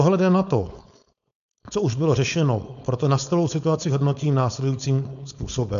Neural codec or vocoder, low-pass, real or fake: codec, 16 kHz, 4.8 kbps, FACodec; 7.2 kHz; fake